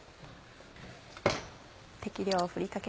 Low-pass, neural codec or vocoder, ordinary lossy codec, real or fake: none; none; none; real